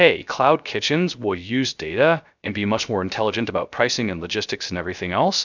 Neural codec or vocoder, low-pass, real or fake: codec, 16 kHz, 0.3 kbps, FocalCodec; 7.2 kHz; fake